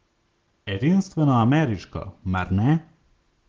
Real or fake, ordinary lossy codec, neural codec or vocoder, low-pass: real; Opus, 16 kbps; none; 7.2 kHz